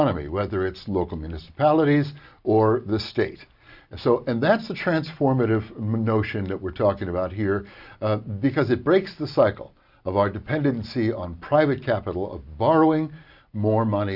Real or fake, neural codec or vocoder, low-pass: real; none; 5.4 kHz